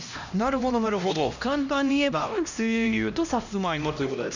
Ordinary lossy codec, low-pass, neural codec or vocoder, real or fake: none; 7.2 kHz; codec, 16 kHz, 1 kbps, X-Codec, HuBERT features, trained on LibriSpeech; fake